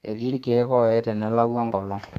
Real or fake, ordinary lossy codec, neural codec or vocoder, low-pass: fake; none; codec, 32 kHz, 1.9 kbps, SNAC; 14.4 kHz